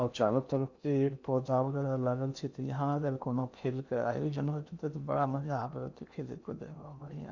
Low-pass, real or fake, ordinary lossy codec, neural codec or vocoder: 7.2 kHz; fake; Opus, 64 kbps; codec, 16 kHz in and 24 kHz out, 0.8 kbps, FocalCodec, streaming, 65536 codes